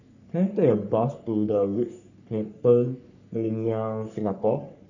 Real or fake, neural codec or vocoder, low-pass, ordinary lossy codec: fake; codec, 44.1 kHz, 3.4 kbps, Pupu-Codec; 7.2 kHz; none